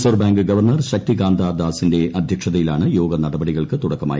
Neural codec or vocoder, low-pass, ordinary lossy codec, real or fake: none; none; none; real